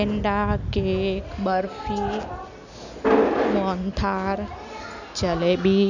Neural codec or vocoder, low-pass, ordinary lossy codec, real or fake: none; 7.2 kHz; none; real